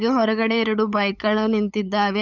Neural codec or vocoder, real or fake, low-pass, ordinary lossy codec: codec, 16 kHz, 8 kbps, FunCodec, trained on LibriTTS, 25 frames a second; fake; 7.2 kHz; none